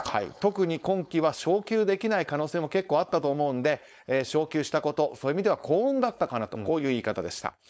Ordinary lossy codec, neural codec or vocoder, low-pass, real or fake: none; codec, 16 kHz, 4.8 kbps, FACodec; none; fake